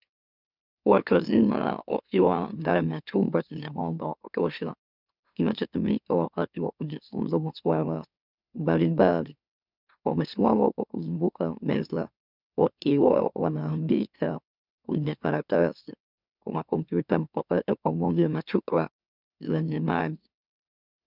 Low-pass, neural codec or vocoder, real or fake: 5.4 kHz; autoencoder, 44.1 kHz, a latent of 192 numbers a frame, MeloTTS; fake